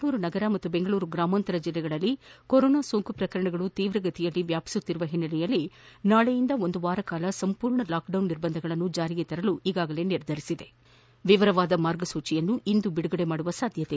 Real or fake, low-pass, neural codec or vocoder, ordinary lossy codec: real; none; none; none